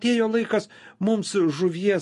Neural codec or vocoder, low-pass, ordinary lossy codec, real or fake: none; 14.4 kHz; MP3, 48 kbps; real